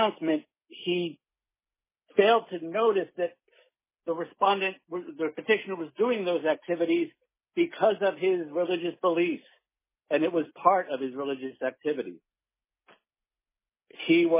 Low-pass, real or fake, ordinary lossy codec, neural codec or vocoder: 3.6 kHz; real; MP3, 16 kbps; none